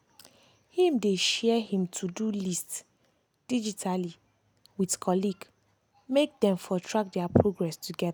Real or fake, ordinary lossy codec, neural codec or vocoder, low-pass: real; none; none; none